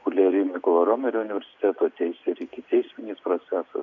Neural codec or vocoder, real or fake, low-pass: none; real; 7.2 kHz